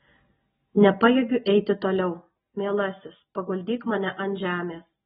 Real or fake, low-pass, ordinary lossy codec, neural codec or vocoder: real; 19.8 kHz; AAC, 16 kbps; none